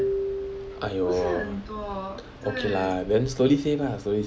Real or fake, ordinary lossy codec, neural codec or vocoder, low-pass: real; none; none; none